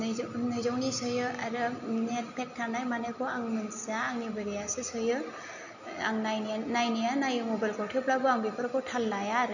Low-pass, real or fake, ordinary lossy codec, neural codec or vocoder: 7.2 kHz; real; none; none